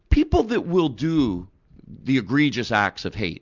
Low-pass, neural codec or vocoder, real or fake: 7.2 kHz; none; real